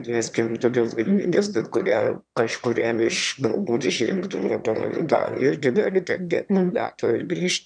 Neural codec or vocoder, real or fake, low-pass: autoencoder, 22.05 kHz, a latent of 192 numbers a frame, VITS, trained on one speaker; fake; 9.9 kHz